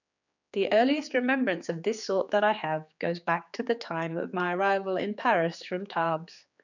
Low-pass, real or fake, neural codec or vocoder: 7.2 kHz; fake; codec, 16 kHz, 4 kbps, X-Codec, HuBERT features, trained on general audio